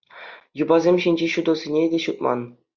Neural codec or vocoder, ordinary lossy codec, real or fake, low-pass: none; Opus, 64 kbps; real; 7.2 kHz